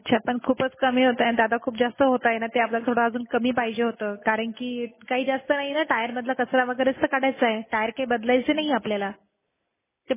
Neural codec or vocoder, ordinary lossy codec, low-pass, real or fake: none; MP3, 16 kbps; 3.6 kHz; real